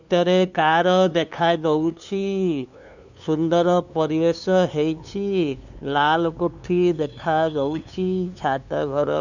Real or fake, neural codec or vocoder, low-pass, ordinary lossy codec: fake; codec, 16 kHz, 2 kbps, FunCodec, trained on LibriTTS, 25 frames a second; 7.2 kHz; none